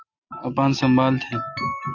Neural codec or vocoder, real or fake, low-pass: none; real; 7.2 kHz